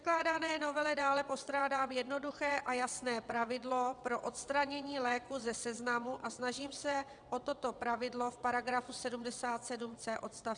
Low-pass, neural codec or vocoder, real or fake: 9.9 kHz; vocoder, 22.05 kHz, 80 mel bands, WaveNeXt; fake